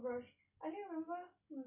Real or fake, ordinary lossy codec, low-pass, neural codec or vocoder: real; none; 3.6 kHz; none